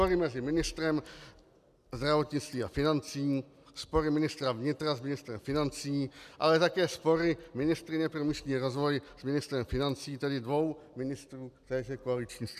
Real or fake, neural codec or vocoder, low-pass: real; none; 14.4 kHz